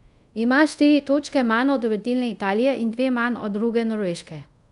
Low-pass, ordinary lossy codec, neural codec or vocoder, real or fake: 10.8 kHz; none; codec, 24 kHz, 0.5 kbps, DualCodec; fake